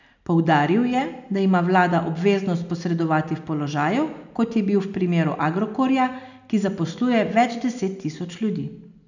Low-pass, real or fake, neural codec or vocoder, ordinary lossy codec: 7.2 kHz; real; none; none